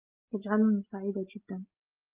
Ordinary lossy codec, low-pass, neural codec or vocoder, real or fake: Opus, 24 kbps; 3.6 kHz; none; real